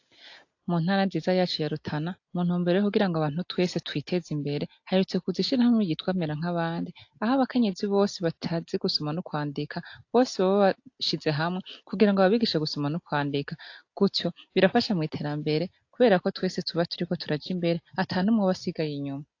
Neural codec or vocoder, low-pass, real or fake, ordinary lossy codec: none; 7.2 kHz; real; AAC, 48 kbps